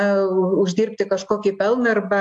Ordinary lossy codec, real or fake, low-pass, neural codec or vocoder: AAC, 64 kbps; real; 10.8 kHz; none